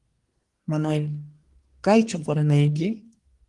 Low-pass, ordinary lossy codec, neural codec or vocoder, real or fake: 10.8 kHz; Opus, 24 kbps; codec, 24 kHz, 1 kbps, SNAC; fake